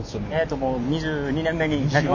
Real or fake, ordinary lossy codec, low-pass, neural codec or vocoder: real; none; 7.2 kHz; none